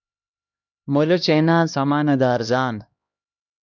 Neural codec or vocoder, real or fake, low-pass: codec, 16 kHz, 1 kbps, X-Codec, HuBERT features, trained on LibriSpeech; fake; 7.2 kHz